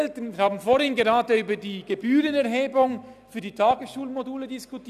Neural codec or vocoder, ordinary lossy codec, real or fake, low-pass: none; none; real; 14.4 kHz